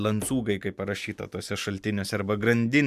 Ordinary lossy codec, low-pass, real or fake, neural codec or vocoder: MP3, 96 kbps; 14.4 kHz; real; none